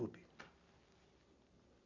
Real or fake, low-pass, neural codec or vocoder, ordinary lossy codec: real; 7.2 kHz; none; none